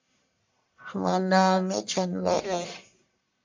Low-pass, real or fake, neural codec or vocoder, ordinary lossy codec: 7.2 kHz; fake; codec, 44.1 kHz, 1.7 kbps, Pupu-Codec; MP3, 64 kbps